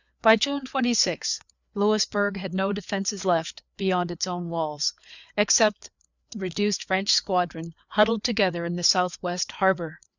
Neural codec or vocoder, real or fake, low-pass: codec, 16 kHz, 4 kbps, FreqCodec, larger model; fake; 7.2 kHz